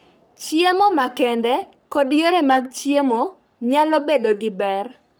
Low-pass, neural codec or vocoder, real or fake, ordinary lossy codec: none; codec, 44.1 kHz, 3.4 kbps, Pupu-Codec; fake; none